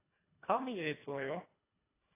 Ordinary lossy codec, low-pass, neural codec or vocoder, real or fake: AAC, 16 kbps; 3.6 kHz; codec, 24 kHz, 1.5 kbps, HILCodec; fake